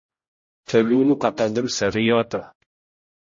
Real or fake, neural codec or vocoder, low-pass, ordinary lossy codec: fake; codec, 16 kHz, 0.5 kbps, X-Codec, HuBERT features, trained on general audio; 7.2 kHz; MP3, 32 kbps